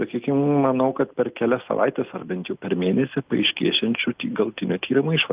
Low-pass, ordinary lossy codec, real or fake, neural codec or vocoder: 3.6 kHz; Opus, 24 kbps; real; none